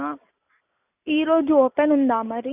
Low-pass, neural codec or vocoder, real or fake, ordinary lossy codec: 3.6 kHz; none; real; none